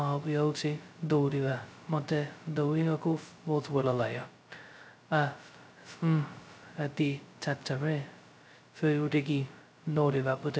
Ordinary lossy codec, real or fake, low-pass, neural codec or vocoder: none; fake; none; codec, 16 kHz, 0.2 kbps, FocalCodec